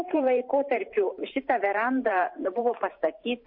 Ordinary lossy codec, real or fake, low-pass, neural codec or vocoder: MP3, 32 kbps; fake; 5.4 kHz; vocoder, 44.1 kHz, 128 mel bands every 512 samples, BigVGAN v2